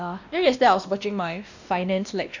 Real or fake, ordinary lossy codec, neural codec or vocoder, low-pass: fake; none; codec, 16 kHz, 1 kbps, X-Codec, WavLM features, trained on Multilingual LibriSpeech; 7.2 kHz